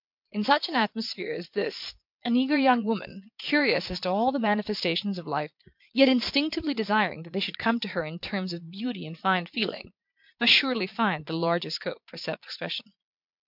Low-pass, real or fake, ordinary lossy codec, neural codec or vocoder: 5.4 kHz; fake; MP3, 48 kbps; vocoder, 22.05 kHz, 80 mel bands, Vocos